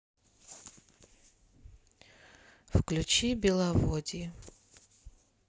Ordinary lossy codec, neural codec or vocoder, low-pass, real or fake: none; none; none; real